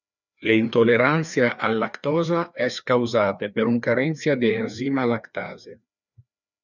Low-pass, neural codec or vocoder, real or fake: 7.2 kHz; codec, 16 kHz, 2 kbps, FreqCodec, larger model; fake